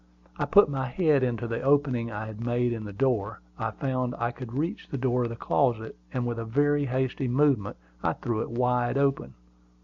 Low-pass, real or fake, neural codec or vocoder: 7.2 kHz; real; none